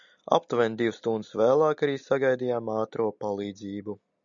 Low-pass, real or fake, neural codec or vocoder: 7.2 kHz; real; none